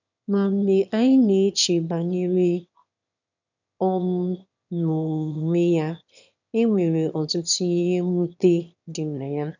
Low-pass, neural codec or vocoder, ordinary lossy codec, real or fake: 7.2 kHz; autoencoder, 22.05 kHz, a latent of 192 numbers a frame, VITS, trained on one speaker; none; fake